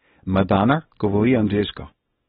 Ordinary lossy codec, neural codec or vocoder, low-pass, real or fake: AAC, 16 kbps; codec, 24 kHz, 0.9 kbps, WavTokenizer, small release; 10.8 kHz; fake